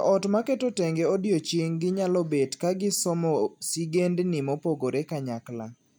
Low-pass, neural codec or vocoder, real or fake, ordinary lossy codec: none; none; real; none